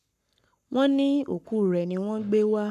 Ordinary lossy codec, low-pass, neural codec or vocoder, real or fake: none; 14.4 kHz; codec, 44.1 kHz, 7.8 kbps, Pupu-Codec; fake